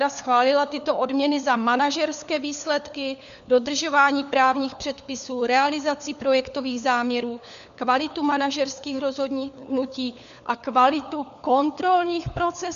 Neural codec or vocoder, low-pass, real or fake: codec, 16 kHz, 4 kbps, FunCodec, trained on LibriTTS, 50 frames a second; 7.2 kHz; fake